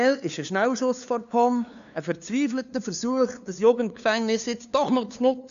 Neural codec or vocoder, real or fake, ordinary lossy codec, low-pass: codec, 16 kHz, 2 kbps, FunCodec, trained on LibriTTS, 25 frames a second; fake; none; 7.2 kHz